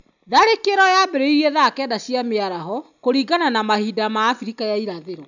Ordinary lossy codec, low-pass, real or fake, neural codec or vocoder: none; 7.2 kHz; real; none